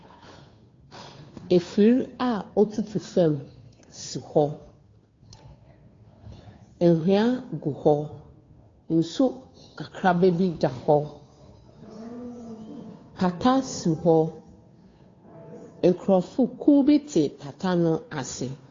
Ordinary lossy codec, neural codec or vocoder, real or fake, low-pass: AAC, 32 kbps; codec, 16 kHz, 2 kbps, FunCodec, trained on Chinese and English, 25 frames a second; fake; 7.2 kHz